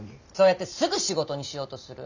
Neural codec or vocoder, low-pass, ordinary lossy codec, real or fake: none; 7.2 kHz; none; real